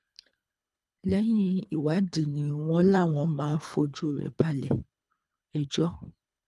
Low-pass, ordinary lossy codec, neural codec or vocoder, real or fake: none; none; codec, 24 kHz, 3 kbps, HILCodec; fake